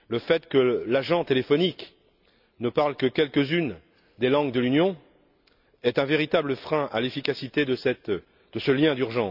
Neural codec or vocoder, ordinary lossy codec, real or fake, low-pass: none; none; real; 5.4 kHz